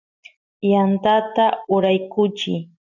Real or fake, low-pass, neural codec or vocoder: real; 7.2 kHz; none